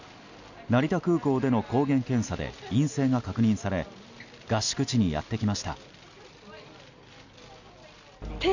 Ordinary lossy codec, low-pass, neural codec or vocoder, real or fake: none; 7.2 kHz; none; real